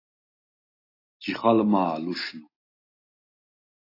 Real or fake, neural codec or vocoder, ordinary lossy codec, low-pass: real; none; AAC, 24 kbps; 5.4 kHz